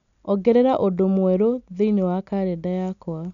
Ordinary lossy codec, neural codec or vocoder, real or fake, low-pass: none; none; real; 7.2 kHz